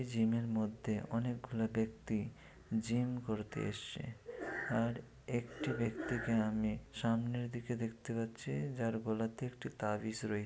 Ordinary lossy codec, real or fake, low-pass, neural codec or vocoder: none; real; none; none